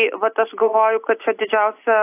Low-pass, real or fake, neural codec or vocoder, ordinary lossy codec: 3.6 kHz; real; none; AAC, 24 kbps